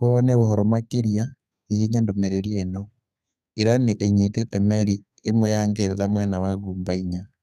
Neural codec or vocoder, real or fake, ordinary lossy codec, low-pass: codec, 32 kHz, 1.9 kbps, SNAC; fake; none; 14.4 kHz